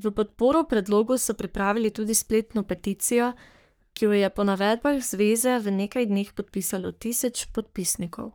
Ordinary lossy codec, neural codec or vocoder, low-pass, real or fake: none; codec, 44.1 kHz, 3.4 kbps, Pupu-Codec; none; fake